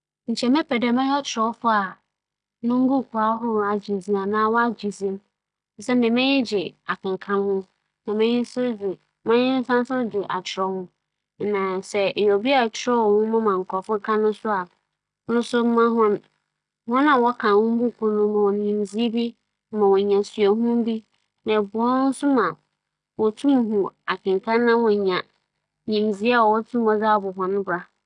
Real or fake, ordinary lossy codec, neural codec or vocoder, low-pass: real; none; none; 9.9 kHz